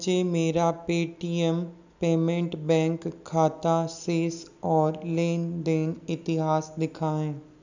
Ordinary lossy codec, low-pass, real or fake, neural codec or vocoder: none; 7.2 kHz; real; none